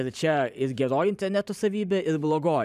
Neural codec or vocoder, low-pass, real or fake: vocoder, 44.1 kHz, 128 mel bands every 512 samples, BigVGAN v2; 14.4 kHz; fake